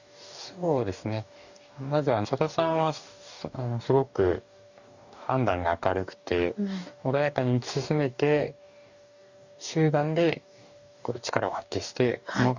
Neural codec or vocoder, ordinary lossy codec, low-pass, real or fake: codec, 44.1 kHz, 2.6 kbps, DAC; none; 7.2 kHz; fake